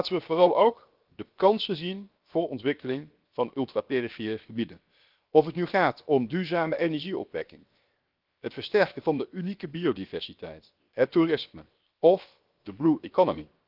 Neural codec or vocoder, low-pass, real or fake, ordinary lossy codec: codec, 16 kHz, 0.7 kbps, FocalCodec; 5.4 kHz; fake; Opus, 32 kbps